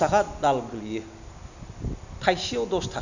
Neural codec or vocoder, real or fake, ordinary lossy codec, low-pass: none; real; none; 7.2 kHz